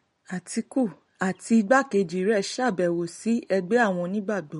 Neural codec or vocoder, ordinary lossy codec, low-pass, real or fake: none; MP3, 48 kbps; 19.8 kHz; real